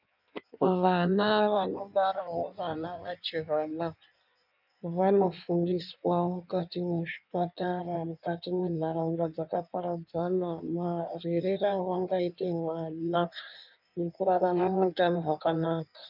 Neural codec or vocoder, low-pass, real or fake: codec, 16 kHz in and 24 kHz out, 1.1 kbps, FireRedTTS-2 codec; 5.4 kHz; fake